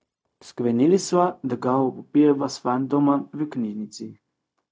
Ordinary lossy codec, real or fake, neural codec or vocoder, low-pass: none; fake; codec, 16 kHz, 0.4 kbps, LongCat-Audio-Codec; none